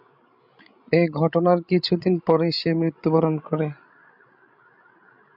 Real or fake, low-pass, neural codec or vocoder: fake; 5.4 kHz; codec, 16 kHz, 16 kbps, FreqCodec, larger model